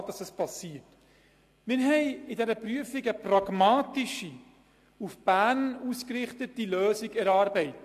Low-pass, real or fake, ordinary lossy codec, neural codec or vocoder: 14.4 kHz; real; AAC, 64 kbps; none